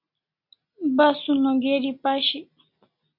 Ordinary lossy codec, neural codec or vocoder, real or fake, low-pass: MP3, 32 kbps; none; real; 5.4 kHz